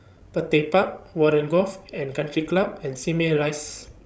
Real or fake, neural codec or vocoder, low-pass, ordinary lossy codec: fake; codec, 16 kHz, 16 kbps, FreqCodec, larger model; none; none